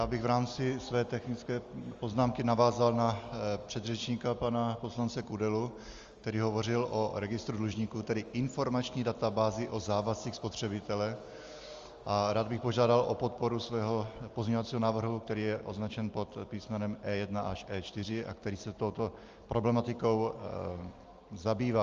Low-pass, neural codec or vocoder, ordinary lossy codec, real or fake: 7.2 kHz; none; Opus, 32 kbps; real